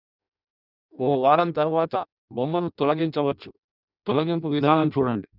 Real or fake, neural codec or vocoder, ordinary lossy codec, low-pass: fake; codec, 16 kHz in and 24 kHz out, 0.6 kbps, FireRedTTS-2 codec; none; 5.4 kHz